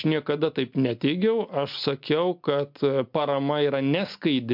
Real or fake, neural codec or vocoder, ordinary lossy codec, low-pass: real; none; MP3, 48 kbps; 5.4 kHz